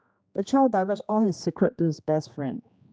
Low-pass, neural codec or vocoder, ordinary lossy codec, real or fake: none; codec, 16 kHz, 2 kbps, X-Codec, HuBERT features, trained on general audio; none; fake